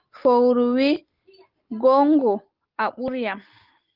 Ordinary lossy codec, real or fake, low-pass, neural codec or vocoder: Opus, 32 kbps; real; 5.4 kHz; none